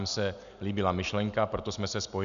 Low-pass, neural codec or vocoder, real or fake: 7.2 kHz; none; real